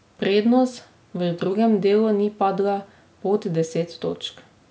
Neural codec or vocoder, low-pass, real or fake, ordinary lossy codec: none; none; real; none